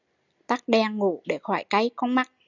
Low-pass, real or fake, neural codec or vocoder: 7.2 kHz; real; none